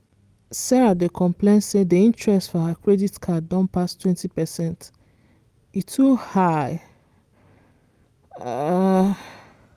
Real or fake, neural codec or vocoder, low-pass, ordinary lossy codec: real; none; 14.4 kHz; Opus, 32 kbps